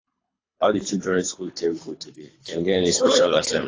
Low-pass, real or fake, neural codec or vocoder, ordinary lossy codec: 7.2 kHz; fake; codec, 24 kHz, 3 kbps, HILCodec; AAC, 32 kbps